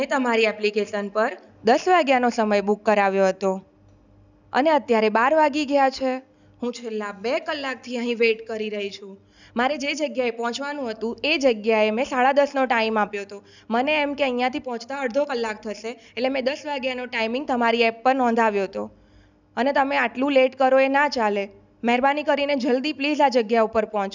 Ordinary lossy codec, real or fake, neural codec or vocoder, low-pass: none; real; none; 7.2 kHz